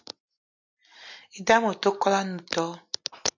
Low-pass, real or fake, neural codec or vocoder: 7.2 kHz; real; none